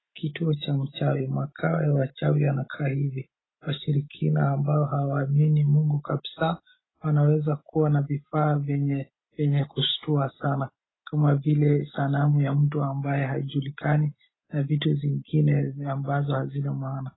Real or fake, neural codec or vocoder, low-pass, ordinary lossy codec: real; none; 7.2 kHz; AAC, 16 kbps